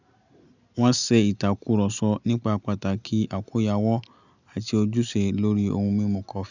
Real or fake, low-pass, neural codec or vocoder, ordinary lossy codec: real; 7.2 kHz; none; none